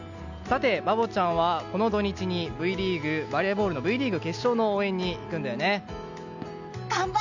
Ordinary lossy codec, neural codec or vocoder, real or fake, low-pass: none; none; real; 7.2 kHz